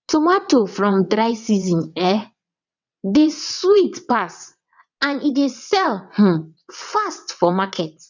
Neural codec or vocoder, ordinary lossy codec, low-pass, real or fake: vocoder, 22.05 kHz, 80 mel bands, WaveNeXt; none; 7.2 kHz; fake